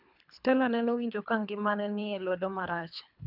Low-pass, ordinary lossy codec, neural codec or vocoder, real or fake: 5.4 kHz; none; codec, 24 kHz, 3 kbps, HILCodec; fake